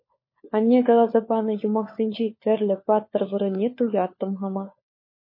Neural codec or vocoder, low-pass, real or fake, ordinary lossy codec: codec, 16 kHz, 4 kbps, FunCodec, trained on LibriTTS, 50 frames a second; 5.4 kHz; fake; MP3, 24 kbps